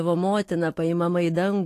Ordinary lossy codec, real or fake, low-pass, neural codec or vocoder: AAC, 48 kbps; fake; 14.4 kHz; autoencoder, 48 kHz, 128 numbers a frame, DAC-VAE, trained on Japanese speech